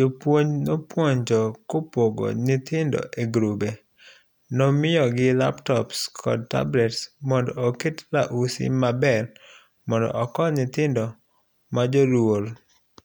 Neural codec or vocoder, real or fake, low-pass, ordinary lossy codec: none; real; none; none